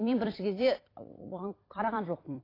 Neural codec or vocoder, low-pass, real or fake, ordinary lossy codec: vocoder, 44.1 kHz, 128 mel bands every 256 samples, BigVGAN v2; 5.4 kHz; fake; AAC, 24 kbps